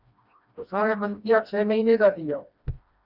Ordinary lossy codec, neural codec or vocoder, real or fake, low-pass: Opus, 64 kbps; codec, 16 kHz, 1 kbps, FreqCodec, smaller model; fake; 5.4 kHz